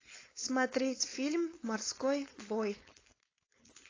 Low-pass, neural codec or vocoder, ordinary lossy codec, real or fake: 7.2 kHz; codec, 16 kHz, 4.8 kbps, FACodec; AAC, 32 kbps; fake